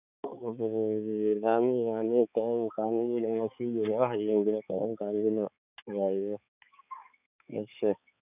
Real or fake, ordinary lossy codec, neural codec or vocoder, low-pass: fake; none; codec, 16 kHz, 4 kbps, X-Codec, HuBERT features, trained on balanced general audio; 3.6 kHz